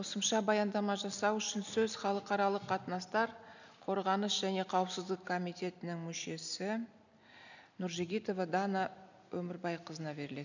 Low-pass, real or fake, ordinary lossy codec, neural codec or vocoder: 7.2 kHz; real; none; none